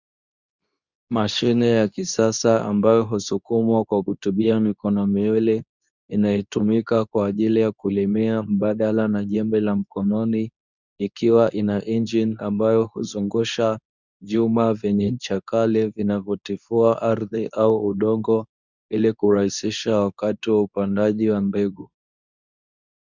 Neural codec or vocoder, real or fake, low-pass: codec, 24 kHz, 0.9 kbps, WavTokenizer, medium speech release version 2; fake; 7.2 kHz